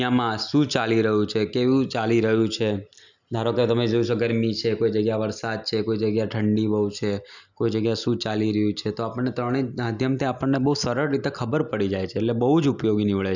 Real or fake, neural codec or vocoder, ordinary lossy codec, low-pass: real; none; none; 7.2 kHz